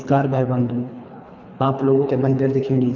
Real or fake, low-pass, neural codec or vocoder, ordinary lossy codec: fake; 7.2 kHz; codec, 24 kHz, 3 kbps, HILCodec; none